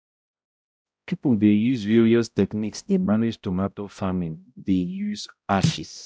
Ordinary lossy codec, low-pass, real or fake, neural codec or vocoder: none; none; fake; codec, 16 kHz, 0.5 kbps, X-Codec, HuBERT features, trained on balanced general audio